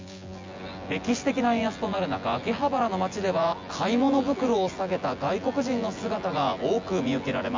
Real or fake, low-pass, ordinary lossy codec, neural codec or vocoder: fake; 7.2 kHz; MP3, 64 kbps; vocoder, 24 kHz, 100 mel bands, Vocos